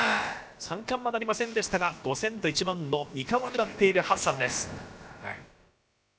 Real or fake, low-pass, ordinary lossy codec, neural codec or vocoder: fake; none; none; codec, 16 kHz, about 1 kbps, DyCAST, with the encoder's durations